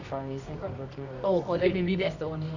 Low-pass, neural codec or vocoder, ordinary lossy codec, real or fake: 7.2 kHz; codec, 24 kHz, 0.9 kbps, WavTokenizer, medium music audio release; none; fake